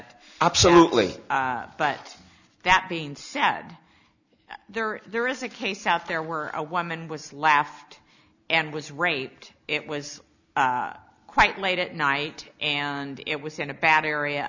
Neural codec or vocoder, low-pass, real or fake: none; 7.2 kHz; real